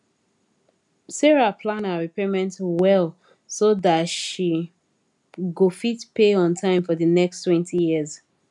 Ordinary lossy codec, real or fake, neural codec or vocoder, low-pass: MP3, 96 kbps; real; none; 10.8 kHz